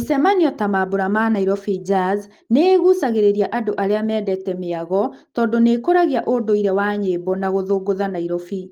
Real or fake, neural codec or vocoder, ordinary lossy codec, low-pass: real; none; Opus, 24 kbps; 19.8 kHz